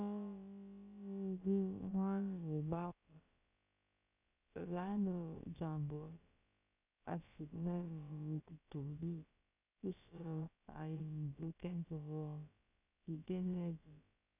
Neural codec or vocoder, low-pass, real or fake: codec, 16 kHz, about 1 kbps, DyCAST, with the encoder's durations; 3.6 kHz; fake